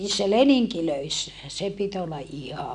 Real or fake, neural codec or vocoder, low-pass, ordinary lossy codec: real; none; 9.9 kHz; MP3, 96 kbps